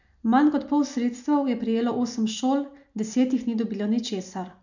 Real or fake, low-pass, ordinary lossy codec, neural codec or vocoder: real; 7.2 kHz; none; none